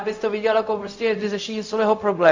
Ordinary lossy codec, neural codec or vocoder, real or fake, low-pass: AAC, 48 kbps; codec, 16 kHz, 0.4 kbps, LongCat-Audio-Codec; fake; 7.2 kHz